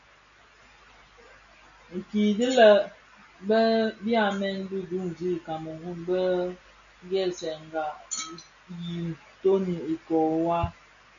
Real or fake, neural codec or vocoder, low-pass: real; none; 7.2 kHz